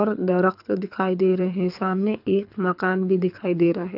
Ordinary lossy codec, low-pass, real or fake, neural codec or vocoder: none; 5.4 kHz; fake; codec, 24 kHz, 6 kbps, HILCodec